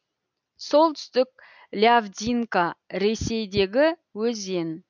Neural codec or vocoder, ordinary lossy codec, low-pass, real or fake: none; none; 7.2 kHz; real